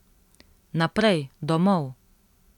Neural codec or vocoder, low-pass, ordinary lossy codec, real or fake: none; 19.8 kHz; none; real